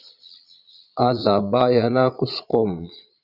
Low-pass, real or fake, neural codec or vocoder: 5.4 kHz; fake; vocoder, 22.05 kHz, 80 mel bands, Vocos